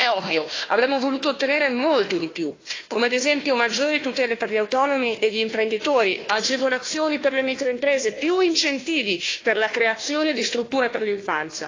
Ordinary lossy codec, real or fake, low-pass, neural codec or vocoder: AAC, 32 kbps; fake; 7.2 kHz; codec, 16 kHz, 1 kbps, FunCodec, trained on Chinese and English, 50 frames a second